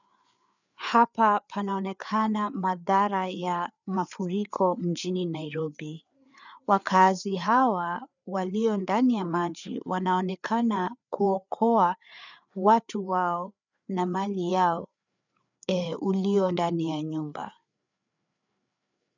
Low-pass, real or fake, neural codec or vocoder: 7.2 kHz; fake; codec, 16 kHz, 4 kbps, FreqCodec, larger model